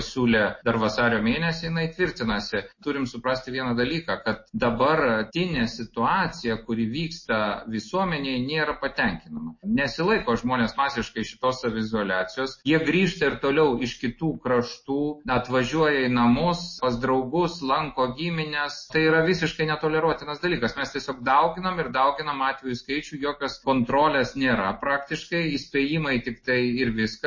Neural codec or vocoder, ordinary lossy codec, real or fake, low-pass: none; MP3, 32 kbps; real; 7.2 kHz